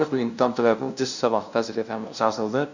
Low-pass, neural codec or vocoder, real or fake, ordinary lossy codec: 7.2 kHz; codec, 16 kHz, 0.5 kbps, FunCodec, trained on LibriTTS, 25 frames a second; fake; none